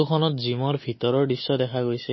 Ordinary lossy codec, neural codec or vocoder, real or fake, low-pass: MP3, 24 kbps; none; real; 7.2 kHz